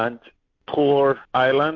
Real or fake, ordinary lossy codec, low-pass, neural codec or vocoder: real; MP3, 48 kbps; 7.2 kHz; none